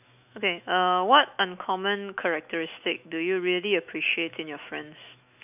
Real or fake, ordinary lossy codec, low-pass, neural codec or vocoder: fake; none; 3.6 kHz; autoencoder, 48 kHz, 128 numbers a frame, DAC-VAE, trained on Japanese speech